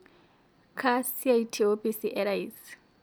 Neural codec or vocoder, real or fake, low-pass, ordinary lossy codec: none; real; none; none